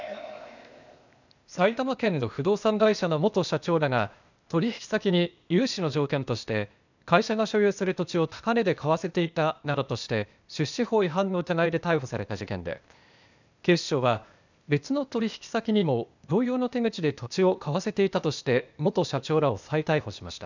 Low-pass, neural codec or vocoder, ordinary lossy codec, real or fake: 7.2 kHz; codec, 16 kHz, 0.8 kbps, ZipCodec; none; fake